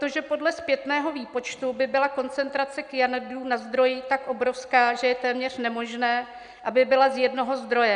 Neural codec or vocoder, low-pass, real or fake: none; 9.9 kHz; real